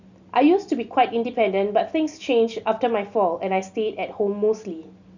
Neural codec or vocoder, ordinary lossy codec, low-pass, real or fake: none; none; 7.2 kHz; real